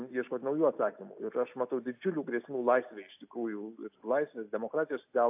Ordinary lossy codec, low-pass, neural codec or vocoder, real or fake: AAC, 32 kbps; 3.6 kHz; none; real